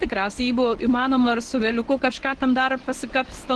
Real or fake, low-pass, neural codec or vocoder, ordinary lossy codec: fake; 10.8 kHz; codec, 24 kHz, 0.9 kbps, WavTokenizer, medium speech release version 1; Opus, 16 kbps